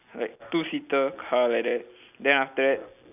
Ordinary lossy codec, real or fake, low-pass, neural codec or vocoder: none; real; 3.6 kHz; none